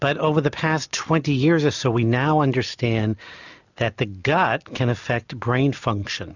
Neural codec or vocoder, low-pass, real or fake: none; 7.2 kHz; real